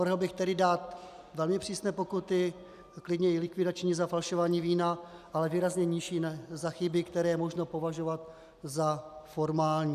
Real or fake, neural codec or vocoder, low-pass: real; none; 14.4 kHz